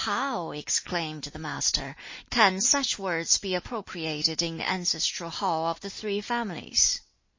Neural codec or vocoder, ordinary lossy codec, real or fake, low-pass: none; MP3, 32 kbps; real; 7.2 kHz